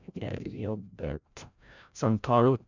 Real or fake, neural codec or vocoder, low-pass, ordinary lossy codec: fake; codec, 16 kHz, 0.5 kbps, FreqCodec, larger model; 7.2 kHz; none